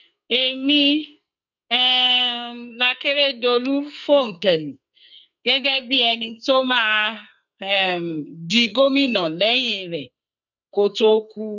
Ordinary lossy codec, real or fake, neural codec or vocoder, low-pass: none; fake; codec, 44.1 kHz, 2.6 kbps, SNAC; 7.2 kHz